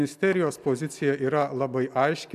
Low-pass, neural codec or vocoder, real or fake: 14.4 kHz; none; real